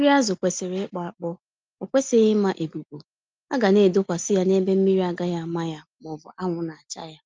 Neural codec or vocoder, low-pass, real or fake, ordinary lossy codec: none; 7.2 kHz; real; Opus, 24 kbps